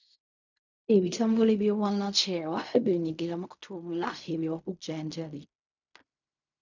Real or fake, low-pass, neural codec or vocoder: fake; 7.2 kHz; codec, 16 kHz in and 24 kHz out, 0.4 kbps, LongCat-Audio-Codec, fine tuned four codebook decoder